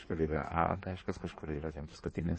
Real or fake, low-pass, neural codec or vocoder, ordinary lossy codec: fake; 9.9 kHz; codec, 16 kHz in and 24 kHz out, 1.1 kbps, FireRedTTS-2 codec; MP3, 32 kbps